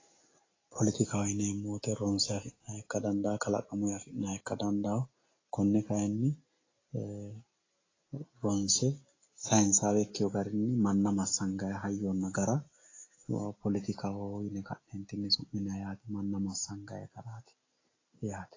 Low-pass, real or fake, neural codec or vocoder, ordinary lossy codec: 7.2 kHz; real; none; AAC, 32 kbps